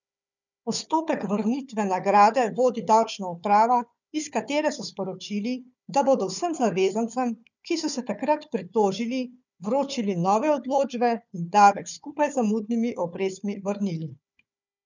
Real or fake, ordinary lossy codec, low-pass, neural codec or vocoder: fake; none; 7.2 kHz; codec, 16 kHz, 4 kbps, FunCodec, trained on Chinese and English, 50 frames a second